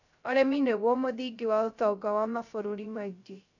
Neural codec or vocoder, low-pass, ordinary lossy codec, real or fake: codec, 16 kHz, 0.3 kbps, FocalCodec; 7.2 kHz; none; fake